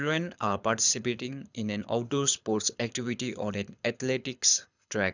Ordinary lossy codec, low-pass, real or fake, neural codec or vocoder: none; 7.2 kHz; fake; codec, 24 kHz, 6 kbps, HILCodec